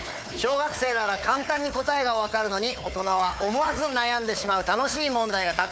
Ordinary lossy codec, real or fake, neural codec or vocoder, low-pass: none; fake; codec, 16 kHz, 4 kbps, FunCodec, trained on Chinese and English, 50 frames a second; none